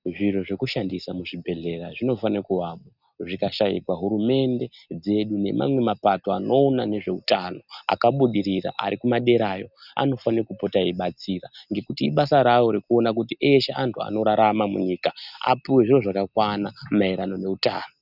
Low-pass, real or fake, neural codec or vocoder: 5.4 kHz; real; none